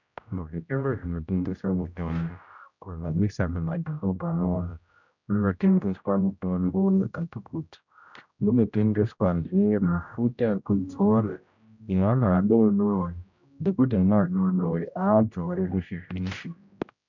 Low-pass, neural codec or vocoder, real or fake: 7.2 kHz; codec, 16 kHz, 0.5 kbps, X-Codec, HuBERT features, trained on general audio; fake